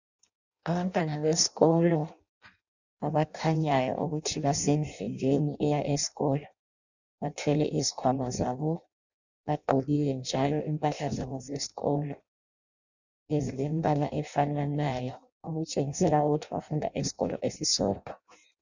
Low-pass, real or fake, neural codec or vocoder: 7.2 kHz; fake; codec, 16 kHz in and 24 kHz out, 0.6 kbps, FireRedTTS-2 codec